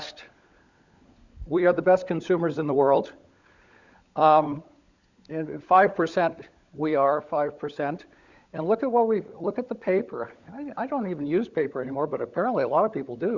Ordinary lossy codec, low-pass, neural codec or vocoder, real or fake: Opus, 64 kbps; 7.2 kHz; codec, 16 kHz, 16 kbps, FunCodec, trained on LibriTTS, 50 frames a second; fake